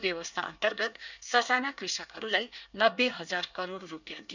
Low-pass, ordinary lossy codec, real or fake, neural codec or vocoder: 7.2 kHz; none; fake; codec, 24 kHz, 1 kbps, SNAC